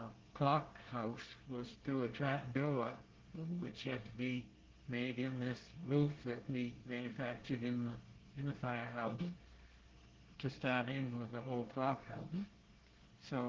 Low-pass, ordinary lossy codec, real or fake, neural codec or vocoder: 7.2 kHz; Opus, 16 kbps; fake; codec, 24 kHz, 1 kbps, SNAC